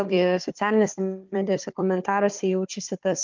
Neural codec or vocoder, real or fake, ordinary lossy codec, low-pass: codec, 16 kHz, 4 kbps, FunCodec, trained on Chinese and English, 50 frames a second; fake; Opus, 24 kbps; 7.2 kHz